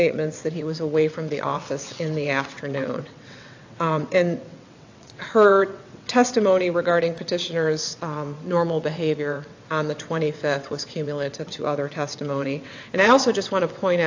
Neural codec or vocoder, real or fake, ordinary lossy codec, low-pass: vocoder, 22.05 kHz, 80 mel bands, WaveNeXt; fake; AAC, 48 kbps; 7.2 kHz